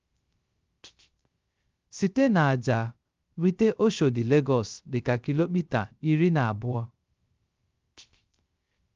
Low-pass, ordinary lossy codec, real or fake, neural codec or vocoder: 7.2 kHz; Opus, 32 kbps; fake; codec, 16 kHz, 0.3 kbps, FocalCodec